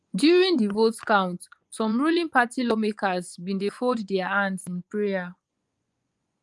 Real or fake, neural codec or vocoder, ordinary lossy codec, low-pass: fake; vocoder, 24 kHz, 100 mel bands, Vocos; Opus, 32 kbps; 10.8 kHz